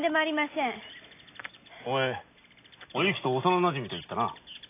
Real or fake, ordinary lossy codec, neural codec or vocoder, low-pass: real; AAC, 24 kbps; none; 3.6 kHz